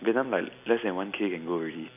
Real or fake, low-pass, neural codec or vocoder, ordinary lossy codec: real; 3.6 kHz; none; none